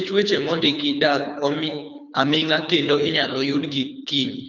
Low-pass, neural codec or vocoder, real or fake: 7.2 kHz; codec, 24 kHz, 3 kbps, HILCodec; fake